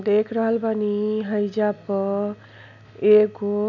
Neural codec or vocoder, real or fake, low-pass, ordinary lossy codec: none; real; 7.2 kHz; none